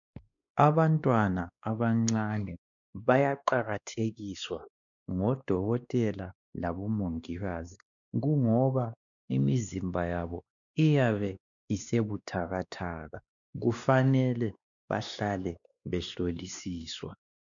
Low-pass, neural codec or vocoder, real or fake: 7.2 kHz; codec, 16 kHz, 2 kbps, X-Codec, WavLM features, trained on Multilingual LibriSpeech; fake